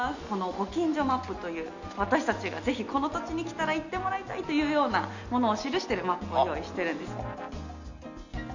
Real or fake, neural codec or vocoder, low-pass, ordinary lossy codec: real; none; 7.2 kHz; none